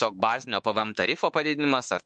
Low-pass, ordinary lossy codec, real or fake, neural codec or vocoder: 9.9 kHz; MP3, 64 kbps; fake; autoencoder, 48 kHz, 32 numbers a frame, DAC-VAE, trained on Japanese speech